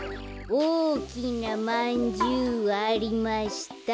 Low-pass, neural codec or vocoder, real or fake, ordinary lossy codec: none; none; real; none